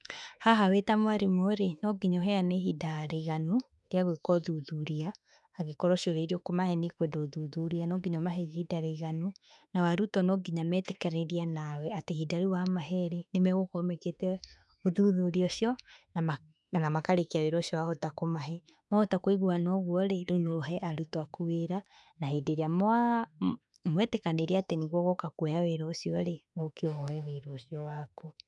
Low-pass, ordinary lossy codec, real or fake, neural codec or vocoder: 10.8 kHz; none; fake; autoencoder, 48 kHz, 32 numbers a frame, DAC-VAE, trained on Japanese speech